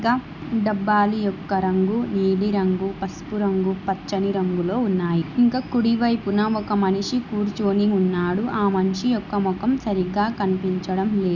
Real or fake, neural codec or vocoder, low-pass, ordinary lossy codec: real; none; 7.2 kHz; none